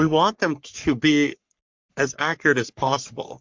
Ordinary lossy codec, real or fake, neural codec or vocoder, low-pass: MP3, 64 kbps; fake; codec, 44.1 kHz, 3.4 kbps, Pupu-Codec; 7.2 kHz